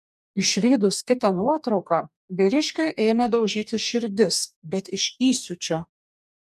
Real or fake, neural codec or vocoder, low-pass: fake; codec, 44.1 kHz, 2.6 kbps, DAC; 14.4 kHz